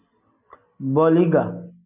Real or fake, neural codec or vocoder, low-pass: real; none; 3.6 kHz